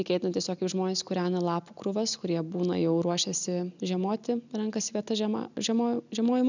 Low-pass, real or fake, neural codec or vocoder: 7.2 kHz; real; none